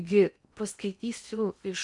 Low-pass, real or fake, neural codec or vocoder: 10.8 kHz; fake; codec, 16 kHz in and 24 kHz out, 0.8 kbps, FocalCodec, streaming, 65536 codes